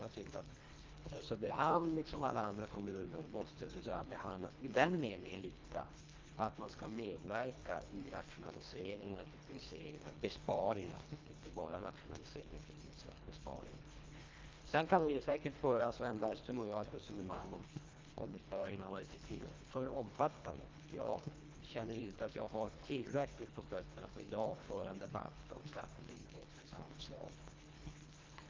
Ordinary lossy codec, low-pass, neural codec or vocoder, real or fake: Opus, 32 kbps; 7.2 kHz; codec, 24 kHz, 1.5 kbps, HILCodec; fake